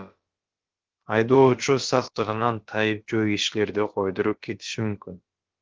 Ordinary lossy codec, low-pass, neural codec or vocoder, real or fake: Opus, 16 kbps; 7.2 kHz; codec, 16 kHz, about 1 kbps, DyCAST, with the encoder's durations; fake